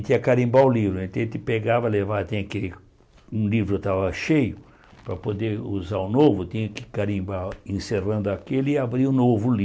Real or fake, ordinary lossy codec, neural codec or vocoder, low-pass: real; none; none; none